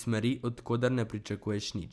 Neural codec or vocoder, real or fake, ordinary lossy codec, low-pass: none; real; none; none